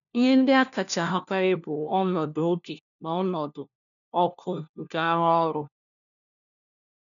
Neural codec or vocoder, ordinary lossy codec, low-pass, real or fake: codec, 16 kHz, 1 kbps, FunCodec, trained on LibriTTS, 50 frames a second; none; 7.2 kHz; fake